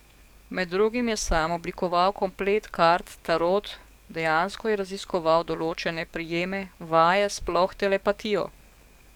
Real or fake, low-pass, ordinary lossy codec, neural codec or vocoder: fake; 19.8 kHz; none; codec, 44.1 kHz, 7.8 kbps, DAC